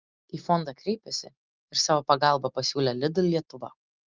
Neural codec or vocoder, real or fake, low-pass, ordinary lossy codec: none; real; 7.2 kHz; Opus, 32 kbps